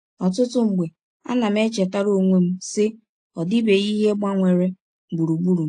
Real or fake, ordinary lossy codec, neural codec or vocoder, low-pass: real; AAC, 48 kbps; none; 9.9 kHz